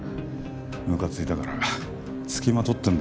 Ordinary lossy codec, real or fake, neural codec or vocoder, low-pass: none; real; none; none